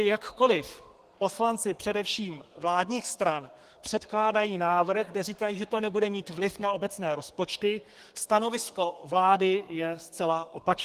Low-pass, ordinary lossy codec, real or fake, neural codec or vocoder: 14.4 kHz; Opus, 24 kbps; fake; codec, 32 kHz, 1.9 kbps, SNAC